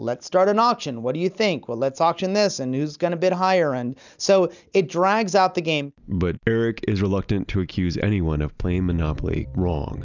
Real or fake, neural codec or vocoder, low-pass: real; none; 7.2 kHz